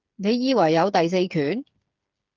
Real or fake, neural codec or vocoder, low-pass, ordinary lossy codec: fake; codec, 16 kHz, 8 kbps, FreqCodec, smaller model; 7.2 kHz; Opus, 32 kbps